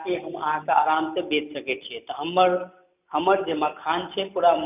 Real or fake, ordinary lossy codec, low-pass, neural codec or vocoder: real; none; 3.6 kHz; none